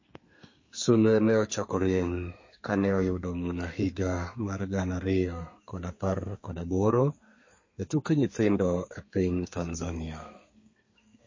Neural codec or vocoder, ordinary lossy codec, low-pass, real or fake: codec, 32 kHz, 1.9 kbps, SNAC; MP3, 32 kbps; 7.2 kHz; fake